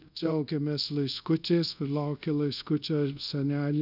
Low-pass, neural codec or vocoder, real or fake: 5.4 kHz; codec, 24 kHz, 0.5 kbps, DualCodec; fake